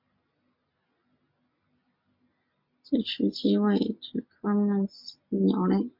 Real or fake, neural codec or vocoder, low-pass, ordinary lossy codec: real; none; 5.4 kHz; MP3, 48 kbps